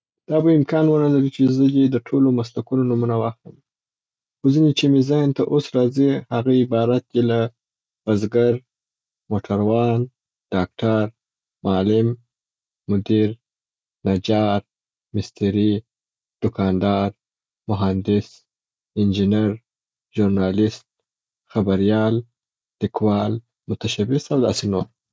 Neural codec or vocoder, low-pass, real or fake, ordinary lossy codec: none; none; real; none